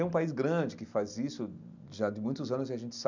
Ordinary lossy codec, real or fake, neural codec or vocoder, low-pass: none; real; none; 7.2 kHz